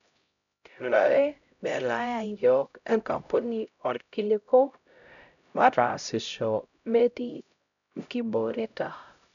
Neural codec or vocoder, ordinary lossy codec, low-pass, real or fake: codec, 16 kHz, 0.5 kbps, X-Codec, HuBERT features, trained on LibriSpeech; none; 7.2 kHz; fake